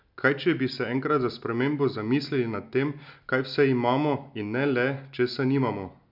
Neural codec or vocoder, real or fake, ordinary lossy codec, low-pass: none; real; none; 5.4 kHz